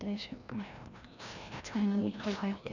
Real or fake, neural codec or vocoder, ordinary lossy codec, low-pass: fake; codec, 16 kHz, 1 kbps, FreqCodec, larger model; none; 7.2 kHz